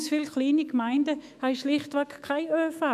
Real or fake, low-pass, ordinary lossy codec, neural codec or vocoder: fake; 14.4 kHz; none; autoencoder, 48 kHz, 128 numbers a frame, DAC-VAE, trained on Japanese speech